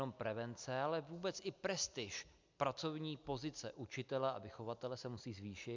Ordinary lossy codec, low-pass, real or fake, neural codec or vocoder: MP3, 64 kbps; 7.2 kHz; real; none